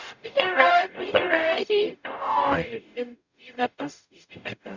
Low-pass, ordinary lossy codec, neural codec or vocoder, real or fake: 7.2 kHz; none; codec, 44.1 kHz, 0.9 kbps, DAC; fake